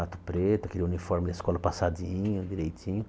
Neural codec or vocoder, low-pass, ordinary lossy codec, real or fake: none; none; none; real